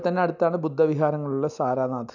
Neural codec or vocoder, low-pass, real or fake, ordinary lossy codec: none; 7.2 kHz; real; none